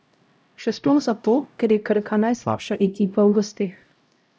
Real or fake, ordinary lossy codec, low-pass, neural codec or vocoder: fake; none; none; codec, 16 kHz, 0.5 kbps, X-Codec, HuBERT features, trained on LibriSpeech